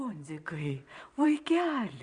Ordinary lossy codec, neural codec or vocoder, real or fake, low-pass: Opus, 64 kbps; vocoder, 22.05 kHz, 80 mel bands, WaveNeXt; fake; 9.9 kHz